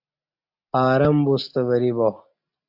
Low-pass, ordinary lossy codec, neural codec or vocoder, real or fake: 5.4 kHz; AAC, 48 kbps; none; real